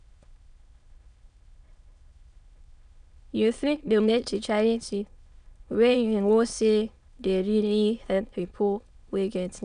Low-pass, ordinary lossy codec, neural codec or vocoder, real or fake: 9.9 kHz; none; autoencoder, 22.05 kHz, a latent of 192 numbers a frame, VITS, trained on many speakers; fake